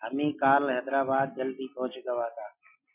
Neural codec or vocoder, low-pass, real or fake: none; 3.6 kHz; real